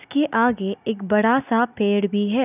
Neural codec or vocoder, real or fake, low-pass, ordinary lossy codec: none; real; 3.6 kHz; none